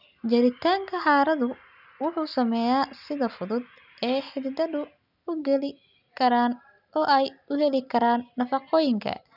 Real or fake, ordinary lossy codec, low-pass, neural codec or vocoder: real; none; 5.4 kHz; none